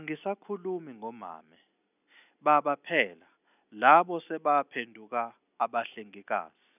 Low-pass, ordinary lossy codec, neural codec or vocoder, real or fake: 3.6 kHz; none; none; real